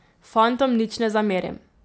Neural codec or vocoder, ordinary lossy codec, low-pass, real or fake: none; none; none; real